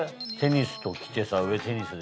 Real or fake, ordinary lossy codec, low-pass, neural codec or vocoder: real; none; none; none